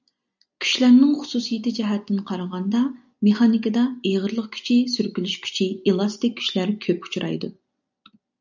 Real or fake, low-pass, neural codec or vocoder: real; 7.2 kHz; none